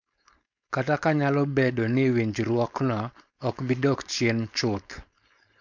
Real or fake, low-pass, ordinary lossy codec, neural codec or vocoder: fake; 7.2 kHz; MP3, 64 kbps; codec, 16 kHz, 4.8 kbps, FACodec